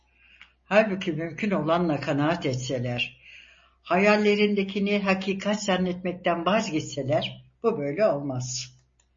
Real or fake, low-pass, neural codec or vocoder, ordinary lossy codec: real; 7.2 kHz; none; MP3, 32 kbps